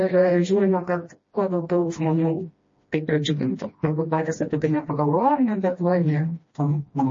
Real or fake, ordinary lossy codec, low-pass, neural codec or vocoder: fake; MP3, 32 kbps; 7.2 kHz; codec, 16 kHz, 1 kbps, FreqCodec, smaller model